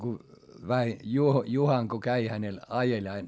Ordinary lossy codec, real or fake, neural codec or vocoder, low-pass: none; real; none; none